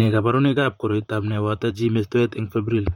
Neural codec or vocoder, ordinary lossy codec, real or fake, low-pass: vocoder, 44.1 kHz, 128 mel bands, Pupu-Vocoder; MP3, 64 kbps; fake; 19.8 kHz